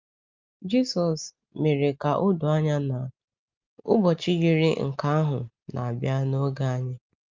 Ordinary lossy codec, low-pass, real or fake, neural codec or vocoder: Opus, 32 kbps; 7.2 kHz; real; none